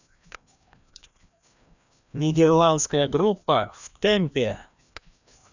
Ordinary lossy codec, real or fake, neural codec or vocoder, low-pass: none; fake; codec, 16 kHz, 1 kbps, FreqCodec, larger model; 7.2 kHz